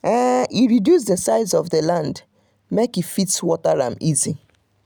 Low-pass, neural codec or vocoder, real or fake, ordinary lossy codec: none; none; real; none